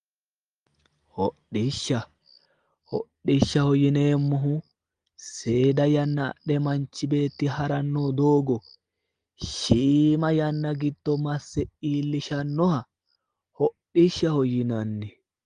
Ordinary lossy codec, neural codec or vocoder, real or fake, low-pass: Opus, 24 kbps; none; real; 9.9 kHz